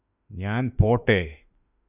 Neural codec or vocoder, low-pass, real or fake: autoencoder, 48 kHz, 32 numbers a frame, DAC-VAE, trained on Japanese speech; 3.6 kHz; fake